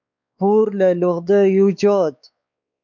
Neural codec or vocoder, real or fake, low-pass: codec, 16 kHz, 4 kbps, X-Codec, WavLM features, trained on Multilingual LibriSpeech; fake; 7.2 kHz